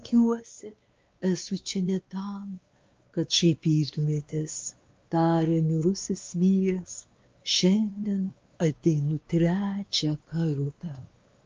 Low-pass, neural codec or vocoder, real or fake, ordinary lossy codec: 7.2 kHz; codec, 16 kHz, 2 kbps, X-Codec, WavLM features, trained on Multilingual LibriSpeech; fake; Opus, 24 kbps